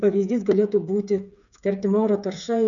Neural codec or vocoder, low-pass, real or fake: codec, 16 kHz, 8 kbps, FreqCodec, smaller model; 7.2 kHz; fake